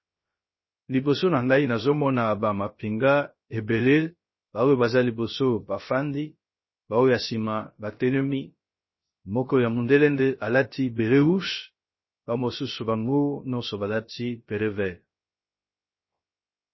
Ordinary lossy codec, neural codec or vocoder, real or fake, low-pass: MP3, 24 kbps; codec, 16 kHz, 0.3 kbps, FocalCodec; fake; 7.2 kHz